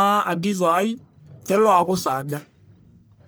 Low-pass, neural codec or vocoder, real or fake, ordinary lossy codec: none; codec, 44.1 kHz, 1.7 kbps, Pupu-Codec; fake; none